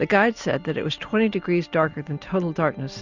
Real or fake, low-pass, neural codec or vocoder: real; 7.2 kHz; none